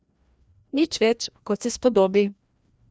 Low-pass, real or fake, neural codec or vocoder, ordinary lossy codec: none; fake; codec, 16 kHz, 1 kbps, FreqCodec, larger model; none